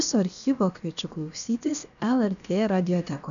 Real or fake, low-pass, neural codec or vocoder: fake; 7.2 kHz; codec, 16 kHz, about 1 kbps, DyCAST, with the encoder's durations